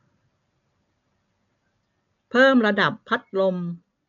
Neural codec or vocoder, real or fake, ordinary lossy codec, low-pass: none; real; none; 7.2 kHz